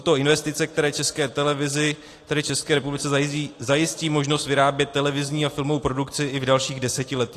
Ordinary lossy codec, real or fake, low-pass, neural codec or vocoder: AAC, 48 kbps; real; 14.4 kHz; none